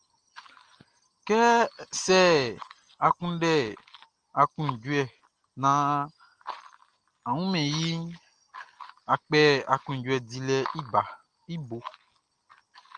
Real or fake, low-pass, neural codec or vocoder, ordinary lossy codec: real; 9.9 kHz; none; Opus, 24 kbps